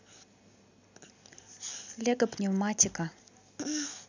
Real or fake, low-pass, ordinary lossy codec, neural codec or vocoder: real; 7.2 kHz; none; none